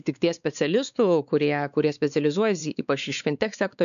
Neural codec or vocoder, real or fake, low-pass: codec, 16 kHz, 4 kbps, X-Codec, WavLM features, trained on Multilingual LibriSpeech; fake; 7.2 kHz